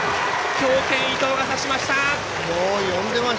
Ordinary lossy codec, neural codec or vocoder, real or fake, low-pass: none; none; real; none